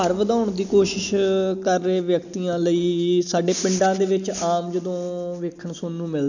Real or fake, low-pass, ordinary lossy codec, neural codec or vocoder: real; 7.2 kHz; none; none